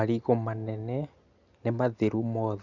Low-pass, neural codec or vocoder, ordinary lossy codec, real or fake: 7.2 kHz; none; none; real